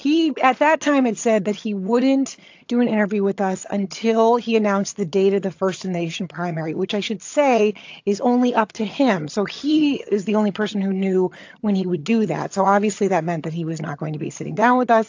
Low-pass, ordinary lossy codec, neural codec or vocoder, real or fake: 7.2 kHz; AAC, 48 kbps; vocoder, 22.05 kHz, 80 mel bands, HiFi-GAN; fake